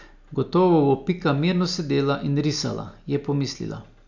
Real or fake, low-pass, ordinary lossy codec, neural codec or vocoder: real; 7.2 kHz; none; none